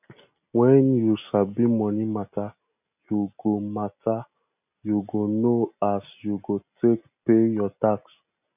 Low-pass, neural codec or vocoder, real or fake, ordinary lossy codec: 3.6 kHz; none; real; none